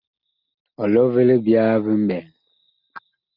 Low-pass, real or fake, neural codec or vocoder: 5.4 kHz; real; none